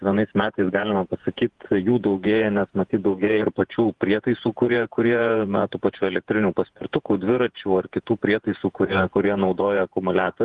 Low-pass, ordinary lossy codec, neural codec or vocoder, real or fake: 10.8 kHz; Opus, 24 kbps; vocoder, 48 kHz, 128 mel bands, Vocos; fake